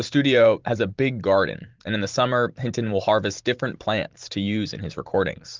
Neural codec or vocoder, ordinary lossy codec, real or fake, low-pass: vocoder, 44.1 kHz, 128 mel bands every 512 samples, BigVGAN v2; Opus, 24 kbps; fake; 7.2 kHz